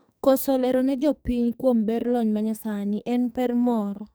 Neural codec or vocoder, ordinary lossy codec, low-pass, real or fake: codec, 44.1 kHz, 2.6 kbps, SNAC; none; none; fake